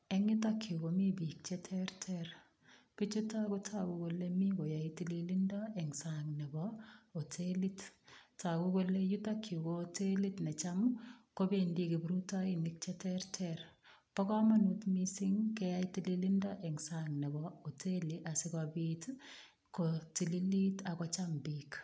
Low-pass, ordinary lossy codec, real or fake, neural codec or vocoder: none; none; real; none